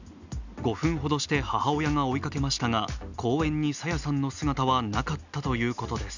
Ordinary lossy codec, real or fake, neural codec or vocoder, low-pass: none; real; none; 7.2 kHz